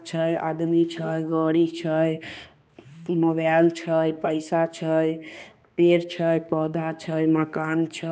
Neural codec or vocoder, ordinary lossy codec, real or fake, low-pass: codec, 16 kHz, 2 kbps, X-Codec, HuBERT features, trained on balanced general audio; none; fake; none